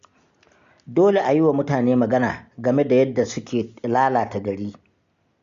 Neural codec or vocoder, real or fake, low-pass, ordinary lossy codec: none; real; 7.2 kHz; Opus, 64 kbps